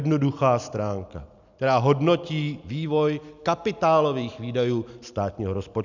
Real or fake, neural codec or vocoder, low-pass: real; none; 7.2 kHz